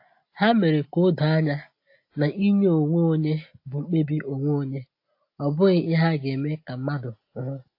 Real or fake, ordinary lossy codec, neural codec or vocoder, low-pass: real; AAC, 32 kbps; none; 5.4 kHz